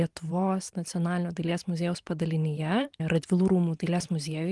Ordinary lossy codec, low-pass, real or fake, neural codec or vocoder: Opus, 24 kbps; 10.8 kHz; fake; vocoder, 44.1 kHz, 128 mel bands every 512 samples, BigVGAN v2